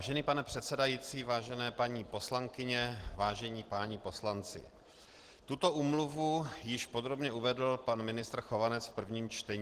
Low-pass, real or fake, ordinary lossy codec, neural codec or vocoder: 14.4 kHz; real; Opus, 16 kbps; none